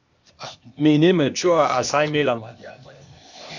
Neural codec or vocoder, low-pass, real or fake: codec, 16 kHz, 0.8 kbps, ZipCodec; 7.2 kHz; fake